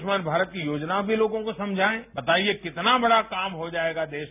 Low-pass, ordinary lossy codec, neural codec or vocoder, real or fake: 3.6 kHz; none; none; real